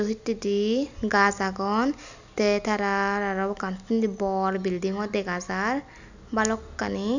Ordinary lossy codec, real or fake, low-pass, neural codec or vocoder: none; real; 7.2 kHz; none